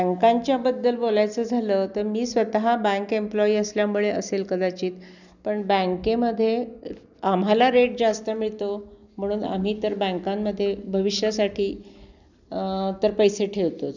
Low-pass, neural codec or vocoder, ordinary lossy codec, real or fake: 7.2 kHz; none; none; real